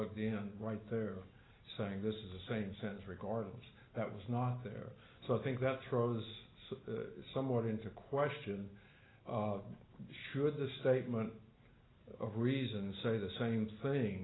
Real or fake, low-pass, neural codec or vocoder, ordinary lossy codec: real; 7.2 kHz; none; AAC, 16 kbps